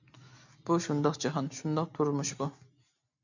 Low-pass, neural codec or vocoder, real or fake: 7.2 kHz; none; real